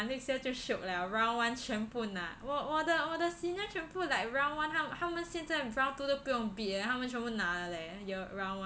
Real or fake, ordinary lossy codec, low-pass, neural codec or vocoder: real; none; none; none